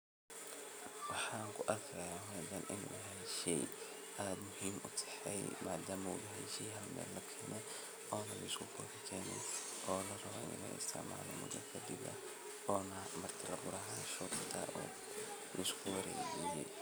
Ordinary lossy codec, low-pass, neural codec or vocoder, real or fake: none; none; none; real